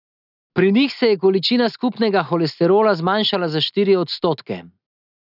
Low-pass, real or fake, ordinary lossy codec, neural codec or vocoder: 5.4 kHz; real; none; none